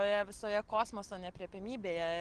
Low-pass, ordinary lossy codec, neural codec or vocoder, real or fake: 9.9 kHz; Opus, 16 kbps; none; real